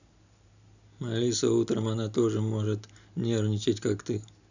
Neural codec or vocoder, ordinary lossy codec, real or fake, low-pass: none; none; real; 7.2 kHz